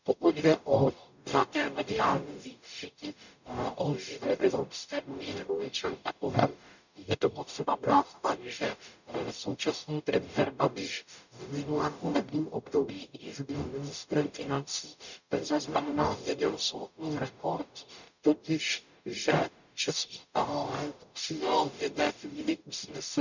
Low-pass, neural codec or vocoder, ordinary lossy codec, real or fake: 7.2 kHz; codec, 44.1 kHz, 0.9 kbps, DAC; none; fake